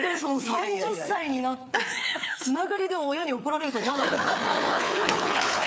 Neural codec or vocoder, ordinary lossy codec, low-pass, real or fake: codec, 16 kHz, 4 kbps, FreqCodec, larger model; none; none; fake